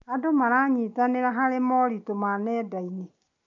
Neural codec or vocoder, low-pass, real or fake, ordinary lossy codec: none; 7.2 kHz; real; none